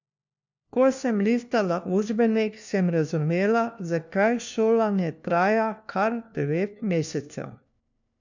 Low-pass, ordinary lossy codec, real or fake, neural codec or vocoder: 7.2 kHz; none; fake; codec, 16 kHz, 1 kbps, FunCodec, trained on LibriTTS, 50 frames a second